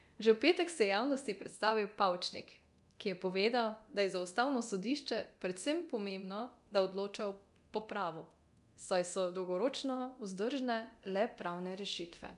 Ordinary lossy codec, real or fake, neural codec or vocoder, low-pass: none; fake; codec, 24 kHz, 0.9 kbps, DualCodec; 10.8 kHz